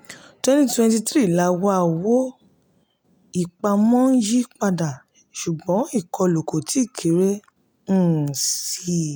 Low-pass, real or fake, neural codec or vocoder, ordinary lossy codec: none; real; none; none